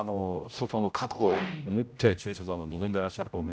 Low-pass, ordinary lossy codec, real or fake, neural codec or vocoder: none; none; fake; codec, 16 kHz, 0.5 kbps, X-Codec, HuBERT features, trained on general audio